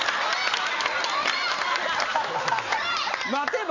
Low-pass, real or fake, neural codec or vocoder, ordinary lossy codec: 7.2 kHz; real; none; MP3, 64 kbps